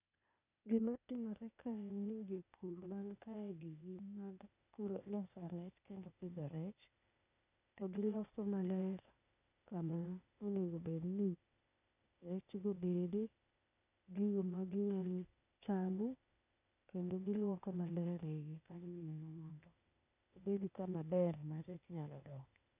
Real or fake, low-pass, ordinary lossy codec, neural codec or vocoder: fake; 3.6 kHz; none; codec, 16 kHz, 0.8 kbps, ZipCodec